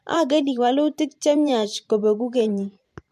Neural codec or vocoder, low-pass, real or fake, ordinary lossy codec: vocoder, 44.1 kHz, 128 mel bands every 512 samples, BigVGAN v2; 14.4 kHz; fake; MP3, 64 kbps